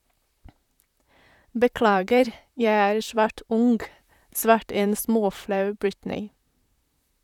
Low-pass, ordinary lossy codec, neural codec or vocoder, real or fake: 19.8 kHz; none; vocoder, 44.1 kHz, 128 mel bands, Pupu-Vocoder; fake